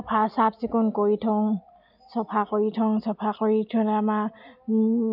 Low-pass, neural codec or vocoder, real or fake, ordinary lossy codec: 5.4 kHz; none; real; none